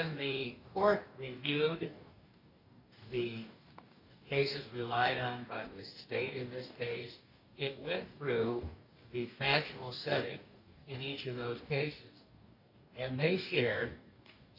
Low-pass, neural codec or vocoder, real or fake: 5.4 kHz; codec, 44.1 kHz, 2.6 kbps, DAC; fake